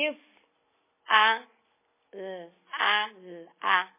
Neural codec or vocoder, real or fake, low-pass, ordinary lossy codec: none; real; 3.6 kHz; MP3, 16 kbps